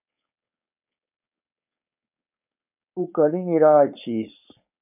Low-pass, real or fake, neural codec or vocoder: 3.6 kHz; fake; codec, 16 kHz, 4.8 kbps, FACodec